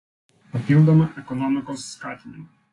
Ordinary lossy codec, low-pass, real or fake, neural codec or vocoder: AAC, 32 kbps; 10.8 kHz; real; none